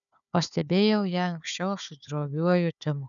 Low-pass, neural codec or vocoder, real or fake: 7.2 kHz; codec, 16 kHz, 4 kbps, FunCodec, trained on Chinese and English, 50 frames a second; fake